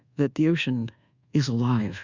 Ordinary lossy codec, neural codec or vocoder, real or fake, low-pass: Opus, 64 kbps; codec, 24 kHz, 1.2 kbps, DualCodec; fake; 7.2 kHz